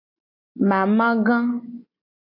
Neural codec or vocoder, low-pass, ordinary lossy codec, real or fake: none; 5.4 kHz; MP3, 32 kbps; real